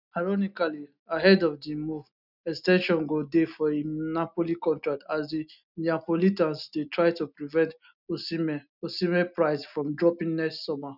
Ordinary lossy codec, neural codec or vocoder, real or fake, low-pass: none; none; real; 5.4 kHz